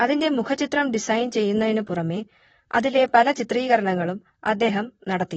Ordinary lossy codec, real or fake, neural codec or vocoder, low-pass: AAC, 24 kbps; fake; vocoder, 44.1 kHz, 128 mel bands, Pupu-Vocoder; 19.8 kHz